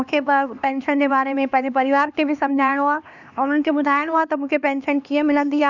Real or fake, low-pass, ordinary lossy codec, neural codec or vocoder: fake; 7.2 kHz; none; codec, 16 kHz, 2 kbps, X-Codec, HuBERT features, trained on LibriSpeech